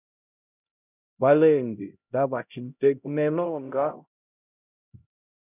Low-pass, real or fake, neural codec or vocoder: 3.6 kHz; fake; codec, 16 kHz, 0.5 kbps, X-Codec, HuBERT features, trained on LibriSpeech